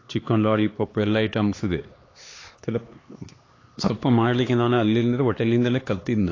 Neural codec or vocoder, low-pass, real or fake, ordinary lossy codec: codec, 16 kHz, 2 kbps, X-Codec, HuBERT features, trained on LibriSpeech; 7.2 kHz; fake; AAC, 32 kbps